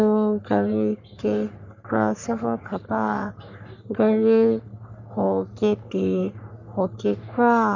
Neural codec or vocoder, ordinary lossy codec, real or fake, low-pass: codec, 44.1 kHz, 3.4 kbps, Pupu-Codec; none; fake; 7.2 kHz